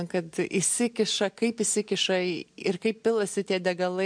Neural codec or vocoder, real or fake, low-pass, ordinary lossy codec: none; real; 9.9 kHz; MP3, 64 kbps